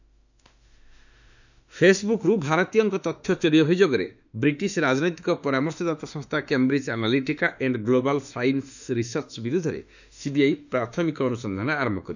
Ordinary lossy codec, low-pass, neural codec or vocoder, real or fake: none; 7.2 kHz; autoencoder, 48 kHz, 32 numbers a frame, DAC-VAE, trained on Japanese speech; fake